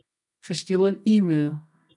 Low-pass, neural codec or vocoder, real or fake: 10.8 kHz; codec, 24 kHz, 0.9 kbps, WavTokenizer, medium music audio release; fake